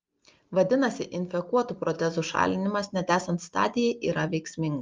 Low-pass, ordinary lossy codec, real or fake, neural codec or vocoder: 7.2 kHz; Opus, 32 kbps; real; none